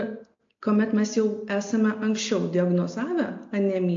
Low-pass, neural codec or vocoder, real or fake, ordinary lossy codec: 7.2 kHz; none; real; AAC, 48 kbps